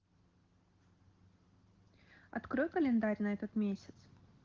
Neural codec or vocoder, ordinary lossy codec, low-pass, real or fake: none; Opus, 16 kbps; 7.2 kHz; real